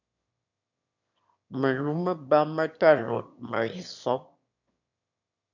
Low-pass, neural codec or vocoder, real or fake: 7.2 kHz; autoencoder, 22.05 kHz, a latent of 192 numbers a frame, VITS, trained on one speaker; fake